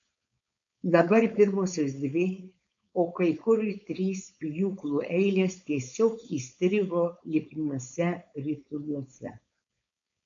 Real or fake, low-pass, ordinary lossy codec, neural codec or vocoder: fake; 7.2 kHz; AAC, 64 kbps; codec, 16 kHz, 4.8 kbps, FACodec